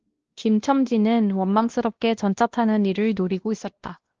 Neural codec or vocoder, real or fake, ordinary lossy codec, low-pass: codec, 16 kHz, 1 kbps, X-Codec, WavLM features, trained on Multilingual LibriSpeech; fake; Opus, 16 kbps; 7.2 kHz